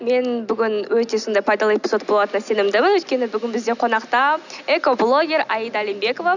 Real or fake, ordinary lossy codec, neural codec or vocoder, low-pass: real; none; none; 7.2 kHz